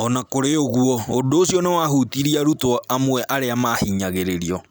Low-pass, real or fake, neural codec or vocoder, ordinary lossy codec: none; real; none; none